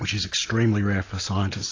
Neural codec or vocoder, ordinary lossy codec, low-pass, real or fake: none; AAC, 32 kbps; 7.2 kHz; real